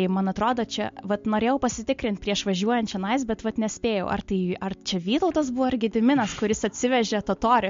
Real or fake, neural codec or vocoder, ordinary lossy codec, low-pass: real; none; MP3, 48 kbps; 7.2 kHz